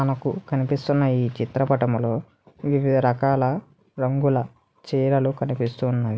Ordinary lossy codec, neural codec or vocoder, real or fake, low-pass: none; none; real; none